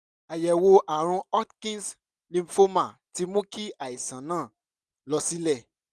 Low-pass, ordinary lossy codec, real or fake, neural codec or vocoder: none; none; real; none